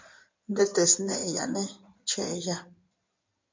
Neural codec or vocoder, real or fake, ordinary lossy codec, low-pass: codec, 16 kHz in and 24 kHz out, 2.2 kbps, FireRedTTS-2 codec; fake; MP3, 48 kbps; 7.2 kHz